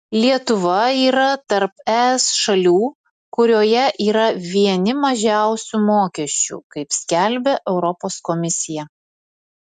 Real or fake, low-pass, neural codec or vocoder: real; 10.8 kHz; none